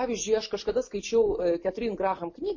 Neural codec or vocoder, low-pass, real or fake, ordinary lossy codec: none; 7.2 kHz; real; MP3, 32 kbps